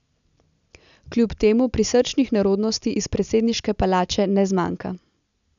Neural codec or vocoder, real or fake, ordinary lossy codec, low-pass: none; real; none; 7.2 kHz